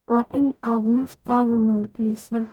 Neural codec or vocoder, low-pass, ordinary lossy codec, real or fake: codec, 44.1 kHz, 0.9 kbps, DAC; 19.8 kHz; none; fake